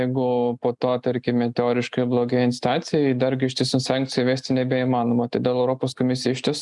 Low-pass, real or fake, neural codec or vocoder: 10.8 kHz; real; none